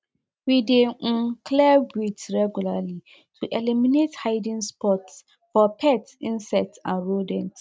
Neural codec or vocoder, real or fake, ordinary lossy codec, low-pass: none; real; none; none